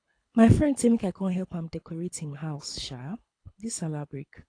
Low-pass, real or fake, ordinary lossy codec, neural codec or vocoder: 9.9 kHz; fake; AAC, 48 kbps; codec, 24 kHz, 6 kbps, HILCodec